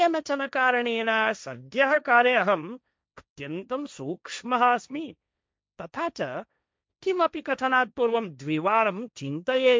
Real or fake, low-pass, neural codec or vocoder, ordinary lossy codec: fake; none; codec, 16 kHz, 1.1 kbps, Voila-Tokenizer; none